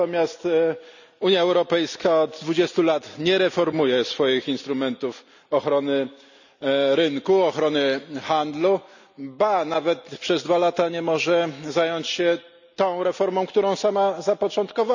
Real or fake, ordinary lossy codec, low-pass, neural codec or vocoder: real; none; 7.2 kHz; none